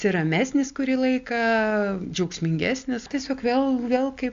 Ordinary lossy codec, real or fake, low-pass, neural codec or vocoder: AAC, 64 kbps; real; 7.2 kHz; none